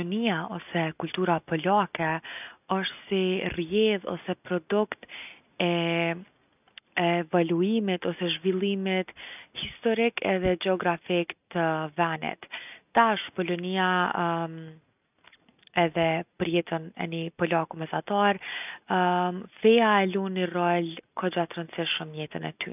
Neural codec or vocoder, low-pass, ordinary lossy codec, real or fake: none; 3.6 kHz; none; real